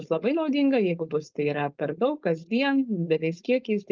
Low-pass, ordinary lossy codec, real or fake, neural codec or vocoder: 7.2 kHz; Opus, 32 kbps; fake; codec, 16 kHz, 4.8 kbps, FACodec